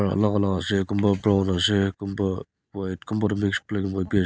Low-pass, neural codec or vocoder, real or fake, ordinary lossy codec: none; none; real; none